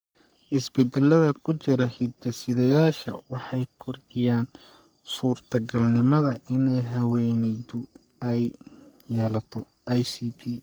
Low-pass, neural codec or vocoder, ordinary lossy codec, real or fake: none; codec, 44.1 kHz, 3.4 kbps, Pupu-Codec; none; fake